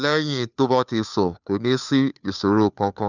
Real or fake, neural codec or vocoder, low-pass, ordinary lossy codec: fake; codec, 16 kHz, 2 kbps, FunCodec, trained on Chinese and English, 25 frames a second; 7.2 kHz; none